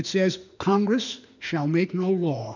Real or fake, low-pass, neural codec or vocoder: fake; 7.2 kHz; autoencoder, 48 kHz, 32 numbers a frame, DAC-VAE, trained on Japanese speech